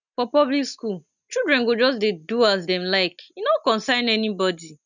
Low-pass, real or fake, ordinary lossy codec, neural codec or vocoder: 7.2 kHz; real; none; none